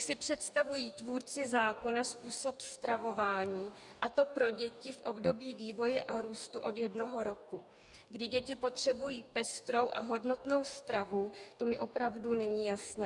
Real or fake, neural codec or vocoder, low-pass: fake; codec, 44.1 kHz, 2.6 kbps, DAC; 10.8 kHz